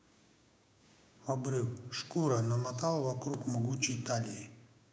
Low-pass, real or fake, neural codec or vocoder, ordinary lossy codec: none; fake; codec, 16 kHz, 6 kbps, DAC; none